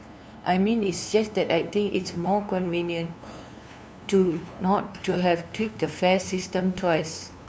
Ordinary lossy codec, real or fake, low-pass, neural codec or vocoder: none; fake; none; codec, 16 kHz, 2 kbps, FunCodec, trained on LibriTTS, 25 frames a second